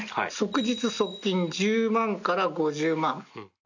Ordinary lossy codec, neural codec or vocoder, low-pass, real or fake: none; none; 7.2 kHz; real